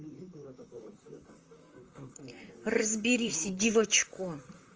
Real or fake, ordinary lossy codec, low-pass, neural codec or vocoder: fake; Opus, 24 kbps; 7.2 kHz; vocoder, 44.1 kHz, 128 mel bands, Pupu-Vocoder